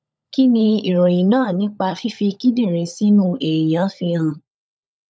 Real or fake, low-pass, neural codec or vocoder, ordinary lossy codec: fake; none; codec, 16 kHz, 16 kbps, FunCodec, trained on LibriTTS, 50 frames a second; none